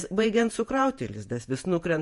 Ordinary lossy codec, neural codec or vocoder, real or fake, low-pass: MP3, 48 kbps; vocoder, 48 kHz, 128 mel bands, Vocos; fake; 14.4 kHz